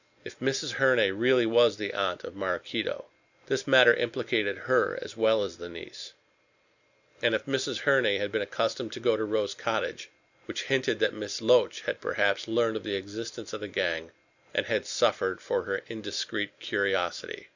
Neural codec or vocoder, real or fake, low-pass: none; real; 7.2 kHz